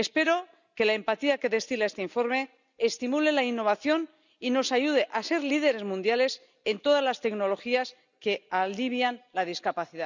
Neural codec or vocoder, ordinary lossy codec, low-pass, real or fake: none; none; 7.2 kHz; real